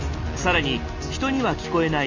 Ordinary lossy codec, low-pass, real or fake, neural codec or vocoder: none; 7.2 kHz; real; none